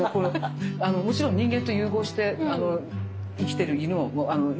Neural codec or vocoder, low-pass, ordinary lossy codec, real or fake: none; none; none; real